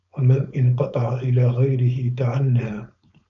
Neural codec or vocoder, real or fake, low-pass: codec, 16 kHz, 4.8 kbps, FACodec; fake; 7.2 kHz